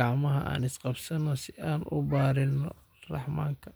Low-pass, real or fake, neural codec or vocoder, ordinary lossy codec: none; real; none; none